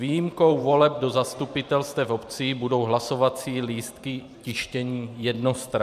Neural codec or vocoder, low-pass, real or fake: vocoder, 44.1 kHz, 128 mel bands every 512 samples, BigVGAN v2; 14.4 kHz; fake